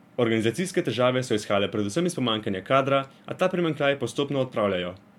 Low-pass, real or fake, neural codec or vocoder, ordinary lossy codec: 19.8 kHz; real; none; MP3, 96 kbps